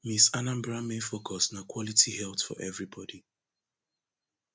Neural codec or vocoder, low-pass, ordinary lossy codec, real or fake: none; none; none; real